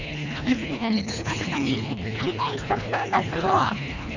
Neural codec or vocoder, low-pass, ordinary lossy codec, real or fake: codec, 24 kHz, 1.5 kbps, HILCodec; 7.2 kHz; none; fake